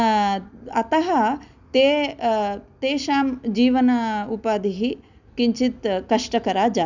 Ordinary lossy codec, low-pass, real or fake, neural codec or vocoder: none; 7.2 kHz; real; none